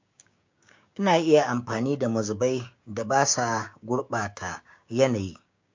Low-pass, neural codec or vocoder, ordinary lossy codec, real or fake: 7.2 kHz; codec, 16 kHz, 6 kbps, DAC; MP3, 48 kbps; fake